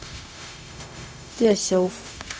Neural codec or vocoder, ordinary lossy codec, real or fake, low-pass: codec, 16 kHz, 0.4 kbps, LongCat-Audio-Codec; none; fake; none